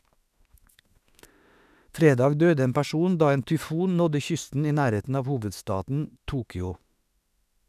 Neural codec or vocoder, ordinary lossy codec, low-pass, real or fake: autoencoder, 48 kHz, 32 numbers a frame, DAC-VAE, trained on Japanese speech; none; 14.4 kHz; fake